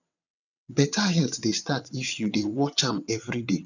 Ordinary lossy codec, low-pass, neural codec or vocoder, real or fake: none; 7.2 kHz; vocoder, 22.05 kHz, 80 mel bands, WaveNeXt; fake